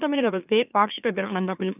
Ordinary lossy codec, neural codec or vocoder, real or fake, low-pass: none; autoencoder, 44.1 kHz, a latent of 192 numbers a frame, MeloTTS; fake; 3.6 kHz